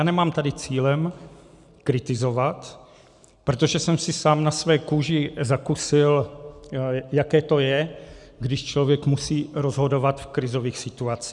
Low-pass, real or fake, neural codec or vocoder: 10.8 kHz; real; none